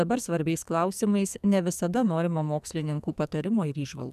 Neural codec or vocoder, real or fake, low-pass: codec, 44.1 kHz, 2.6 kbps, SNAC; fake; 14.4 kHz